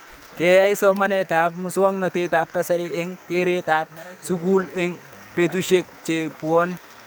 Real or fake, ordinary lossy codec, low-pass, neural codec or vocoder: fake; none; none; codec, 44.1 kHz, 2.6 kbps, SNAC